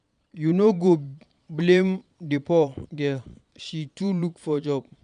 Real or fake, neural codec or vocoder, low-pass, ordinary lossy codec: fake; vocoder, 22.05 kHz, 80 mel bands, Vocos; 9.9 kHz; none